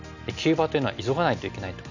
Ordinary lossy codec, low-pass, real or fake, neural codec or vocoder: none; 7.2 kHz; real; none